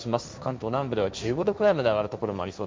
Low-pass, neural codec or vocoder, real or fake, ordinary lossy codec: none; codec, 16 kHz, 1.1 kbps, Voila-Tokenizer; fake; none